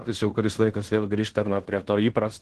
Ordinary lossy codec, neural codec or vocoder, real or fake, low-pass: Opus, 16 kbps; codec, 16 kHz in and 24 kHz out, 0.9 kbps, LongCat-Audio-Codec, fine tuned four codebook decoder; fake; 10.8 kHz